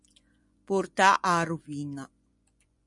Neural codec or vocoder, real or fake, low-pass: vocoder, 24 kHz, 100 mel bands, Vocos; fake; 10.8 kHz